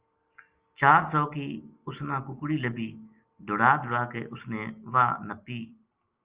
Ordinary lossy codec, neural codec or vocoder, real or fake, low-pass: Opus, 24 kbps; none; real; 3.6 kHz